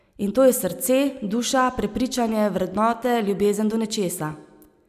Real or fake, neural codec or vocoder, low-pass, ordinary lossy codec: real; none; 14.4 kHz; none